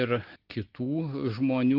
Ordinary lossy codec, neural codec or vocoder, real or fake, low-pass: Opus, 16 kbps; none; real; 5.4 kHz